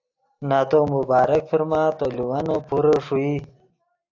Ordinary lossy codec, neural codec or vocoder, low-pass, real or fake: AAC, 48 kbps; none; 7.2 kHz; real